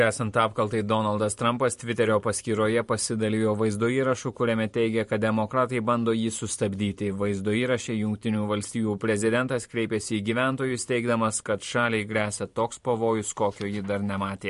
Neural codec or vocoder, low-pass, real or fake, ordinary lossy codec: vocoder, 44.1 kHz, 128 mel bands every 512 samples, BigVGAN v2; 14.4 kHz; fake; MP3, 48 kbps